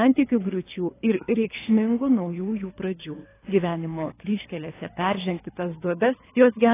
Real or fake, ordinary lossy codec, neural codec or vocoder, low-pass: fake; AAC, 16 kbps; codec, 24 kHz, 3 kbps, HILCodec; 3.6 kHz